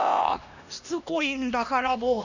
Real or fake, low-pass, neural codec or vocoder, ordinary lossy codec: fake; 7.2 kHz; codec, 16 kHz, 1 kbps, X-Codec, HuBERT features, trained on LibriSpeech; none